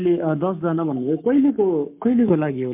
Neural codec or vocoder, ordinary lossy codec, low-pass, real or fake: codec, 44.1 kHz, 7.8 kbps, Pupu-Codec; MP3, 32 kbps; 3.6 kHz; fake